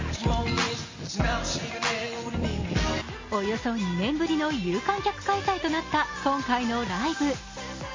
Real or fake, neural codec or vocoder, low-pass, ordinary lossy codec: real; none; 7.2 kHz; MP3, 32 kbps